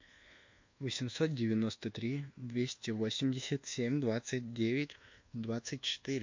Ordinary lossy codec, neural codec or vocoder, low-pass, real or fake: MP3, 48 kbps; codec, 24 kHz, 1.2 kbps, DualCodec; 7.2 kHz; fake